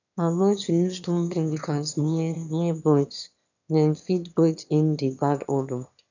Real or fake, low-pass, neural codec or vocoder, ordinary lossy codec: fake; 7.2 kHz; autoencoder, 22.05 kHz, a latent of 192 numbers a frame, VITS, trained on one speaker; none